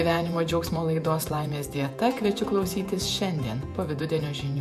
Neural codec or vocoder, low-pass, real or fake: vocoder, 48 kHz, 128 mel bands, Vocos; 14.4 kHz; fake